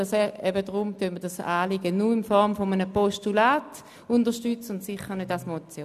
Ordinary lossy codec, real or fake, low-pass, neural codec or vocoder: none; real; 14.4 kHz; none